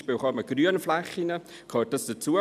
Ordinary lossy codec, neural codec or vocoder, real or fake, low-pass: none; none; real; 14.4 kHz